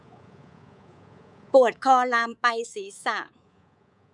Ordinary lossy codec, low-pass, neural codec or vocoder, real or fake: none; 10.8 kHz; codec, 24 kHz, 3.1 kbps, DualCodec; fake